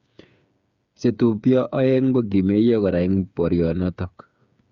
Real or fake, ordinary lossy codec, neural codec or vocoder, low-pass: fake; Opus, 64 kbps; codec, 16 kHz, 8 kbps, FreqCodec, smaller model; 7.2 kHz